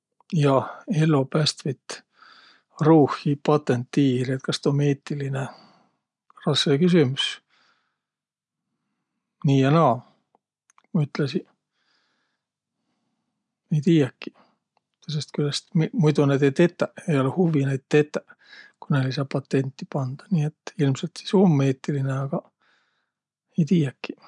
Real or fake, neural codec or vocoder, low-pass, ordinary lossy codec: real; none; 10.8 kHz; none